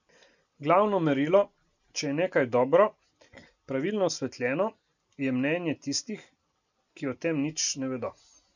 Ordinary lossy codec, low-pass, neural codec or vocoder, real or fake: none; 7.2 kHz; none; real